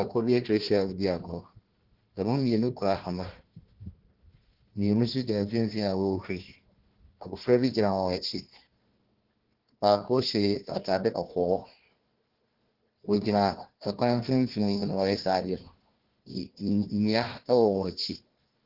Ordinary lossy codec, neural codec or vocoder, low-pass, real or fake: Opus, 16 kbps; codec, 16 kHz, 1 kbps, FunCodec, trained on Chinese and English, 50 frames a second; 5.4 kHz; fake